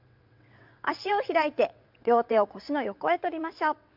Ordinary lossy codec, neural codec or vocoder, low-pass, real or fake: MP3, 48 kbps; vocoder, 22.05 kHz, 80 mel bands, WaveNeXt; 5.4 kHz; fake